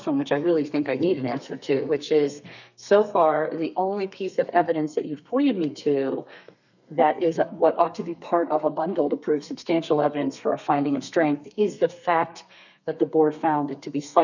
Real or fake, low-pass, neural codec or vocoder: fake; 7.2 kHz; codec, 32 kHz, 1.9 kbps, SNAC